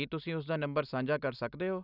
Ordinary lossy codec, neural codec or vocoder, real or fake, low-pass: none; none; real; 5.4 kHz